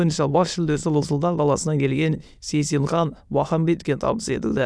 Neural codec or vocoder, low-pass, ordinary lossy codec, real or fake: autoencoder, 22.05 kHz, a latent of 192 numbers a frame, VITS, trained on many speakers; none; none; fake